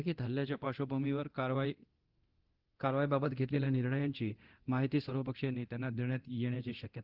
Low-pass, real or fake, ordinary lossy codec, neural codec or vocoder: 5.4 kHz; fake; Opus, 16 kbps; codec, 24 kHz, 0.9 kbps, DualCodec